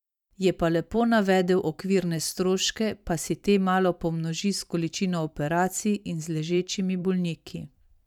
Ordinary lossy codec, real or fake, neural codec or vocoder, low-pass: none; fake; vocoder, 44.1 kHz, 128 mel bands every 512 samples, BigVGAN v2; 19.8 kHz